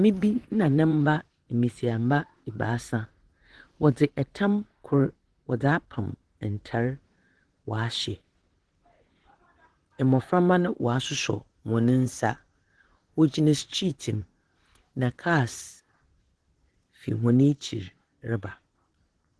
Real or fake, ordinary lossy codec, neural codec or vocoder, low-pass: fake; Opus, 16 kbps; vocoder, 44.1 kHz, 128 mel bands, Pupu-Vocoder; 10.8 kHz